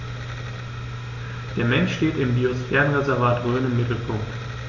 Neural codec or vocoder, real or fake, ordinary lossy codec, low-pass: none; real; none; 7.2 kHz